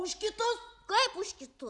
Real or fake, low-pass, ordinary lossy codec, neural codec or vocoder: real; 10.8 kHz; Opus, 64 kbps; none